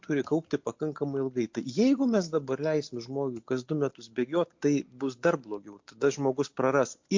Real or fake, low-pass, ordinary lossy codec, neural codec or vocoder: real; 7.2 kHz; MP3, 48 kbps; none